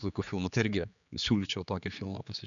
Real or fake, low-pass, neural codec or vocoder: fake; 7.2 kHz; codec, 16 kHz, 4 kbps, X-Codec, HuBERT features, trained on general audio